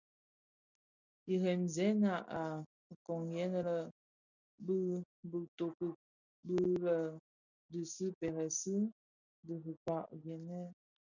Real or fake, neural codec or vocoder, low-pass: real; none; 7.2 kHz